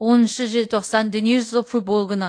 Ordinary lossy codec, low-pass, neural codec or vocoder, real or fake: AAC, 48 kbps; 9.9 kHz; codec, 24 kHz, 0.5 kbps, DualCodec; fake